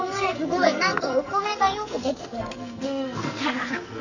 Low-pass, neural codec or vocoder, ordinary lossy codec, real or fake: 7.2 kHz; codec, 44.1 kHz, 2.6 kbps, SNAC; none; fake